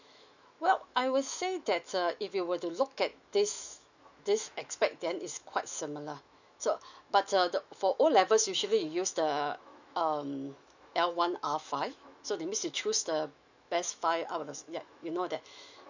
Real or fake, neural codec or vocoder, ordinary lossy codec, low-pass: fake; autoencoder, 48 kHz, 128 numbers a frame, DAC-VAE, trained on Japanese speech; none; 7.2 kHz